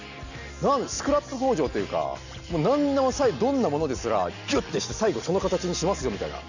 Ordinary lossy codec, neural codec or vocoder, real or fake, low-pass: none; none; real; 7.2 kHz